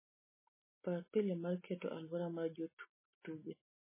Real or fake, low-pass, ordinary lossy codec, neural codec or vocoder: real; 3.6 kHz; MP3, 16 kbps; none